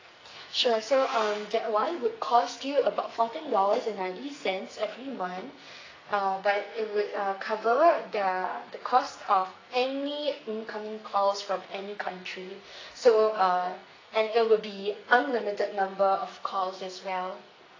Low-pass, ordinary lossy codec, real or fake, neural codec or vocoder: 7.2 kHz; AAC, 32 kbps; fake; codec, 44.1 kHz, 2.6 kbps, SNAC